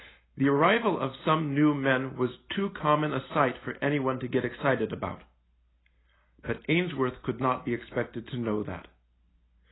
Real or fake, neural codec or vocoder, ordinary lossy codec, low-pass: real; none; AAC, 16 kbps; 7.2 kHz